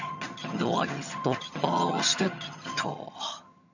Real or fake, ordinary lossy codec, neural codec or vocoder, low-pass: fake; none; vocoder, 22.05 kHz, 80 mel bands, HiFi-GAN; 7.2 kHz